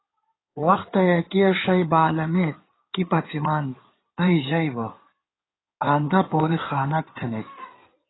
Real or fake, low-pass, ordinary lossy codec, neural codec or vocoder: fake; 7.2 kHz; AAC, 16 kbps; codec, 16 kHz in and 24 kHz out, 2.2 kbps, FireRedTTS-2 codec